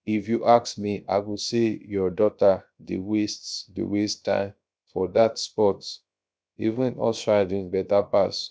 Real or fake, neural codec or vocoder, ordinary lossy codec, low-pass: fake; codec, 16 kHz, 0.7 kbps, FocalCodec; none; none